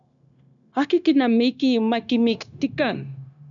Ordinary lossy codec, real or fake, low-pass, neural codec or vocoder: MP3, 96 kbps; fake; 7.2 kHz; codec, 16 kHz, 0.9 kbps, LongCat-Audio-Codec